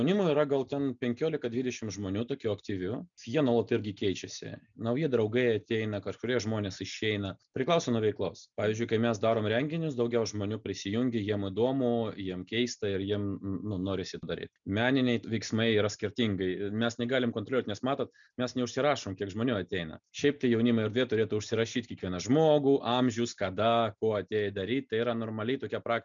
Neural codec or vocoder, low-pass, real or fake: none; 7.2 kHz; real